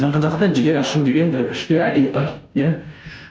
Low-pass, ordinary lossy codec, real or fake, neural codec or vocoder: none; none; fake; codec, 16 kHz, 0.5 kbps, FunCodec, trained on Chinese and English, 25 frames a second